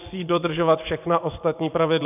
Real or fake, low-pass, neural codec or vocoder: fake; 3.6 kHz; vocoder, 24 kHz, 100 mel bands, Vocos